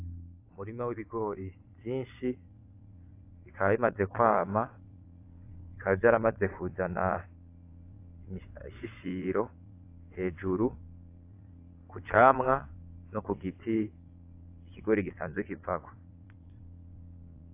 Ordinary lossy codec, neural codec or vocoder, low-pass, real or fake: AAC, 24 kbps; vocoder, 22.05 kHz, 80 mel bands, Vocos; 3.6 kHz; fake